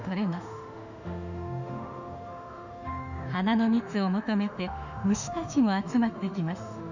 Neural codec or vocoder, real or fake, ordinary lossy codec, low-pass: autoencoder, 48 kHz, 32 numbers a frame, DAC-VAE, trained on Japanese speech; fake; none; 7.2 kHz